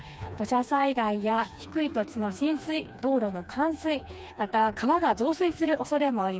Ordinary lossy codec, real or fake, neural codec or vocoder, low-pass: none; fake; codec, 16 kHz, 2 kbps, FreqCodec, smaller model; none